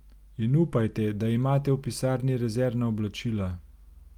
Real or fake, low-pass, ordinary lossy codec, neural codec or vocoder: real; 19.8 kHz; Opus, 32 kbps; none